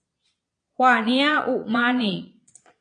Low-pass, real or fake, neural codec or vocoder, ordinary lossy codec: 9.9 kHz; fake; vocoder, 22.05 kHz, 80 mel bands, Vocos; AAC, 48 kbps